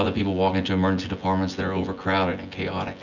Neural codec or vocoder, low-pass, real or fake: vocoder, 24 kHz, 100 mel bands, Vocos; 7.2 kHz; fake